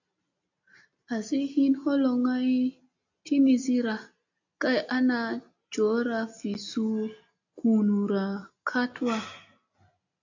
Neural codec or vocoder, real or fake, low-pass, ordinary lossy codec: none; real; 7.2 kHz; AAC, 48 kbps